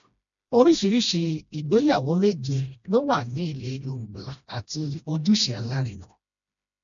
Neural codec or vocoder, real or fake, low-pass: codec, 16 kHz, 1 kbps, FreqCodec, smaller model; fake; 7.2 kHz